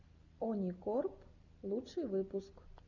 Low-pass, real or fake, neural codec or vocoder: 7.2 kHz; real; none